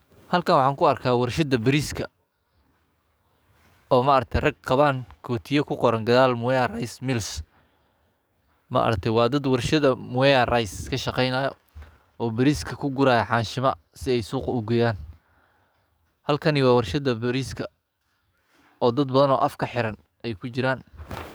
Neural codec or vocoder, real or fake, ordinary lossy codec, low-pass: codec, 44.1 kHz, 7.8 kbps, DAC; fake; none; none